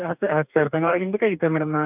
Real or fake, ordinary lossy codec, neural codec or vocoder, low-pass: fake; none; codec, 44.1 kHz, 2.6 kbps, DAC; 3.6 kHz